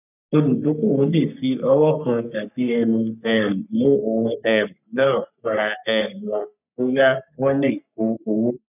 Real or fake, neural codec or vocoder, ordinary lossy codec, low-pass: fake; codec, 44.1 kHz, 3.4 kbps, Pupu-Codec; none; 3.6 kHz